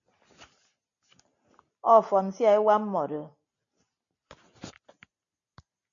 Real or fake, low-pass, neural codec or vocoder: real; 7.2 kHz; none